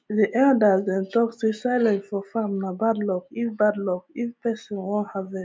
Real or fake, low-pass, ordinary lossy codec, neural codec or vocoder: real; none; none; none